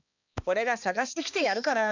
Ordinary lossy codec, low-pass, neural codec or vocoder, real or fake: none; 7.2 kHz; codec, 16 kHz, 2 kbps, X-Codec, HuBERT features, trained on balanced general audio; fake